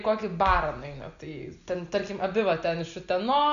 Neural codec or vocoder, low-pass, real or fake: none; 7.2 kHz; real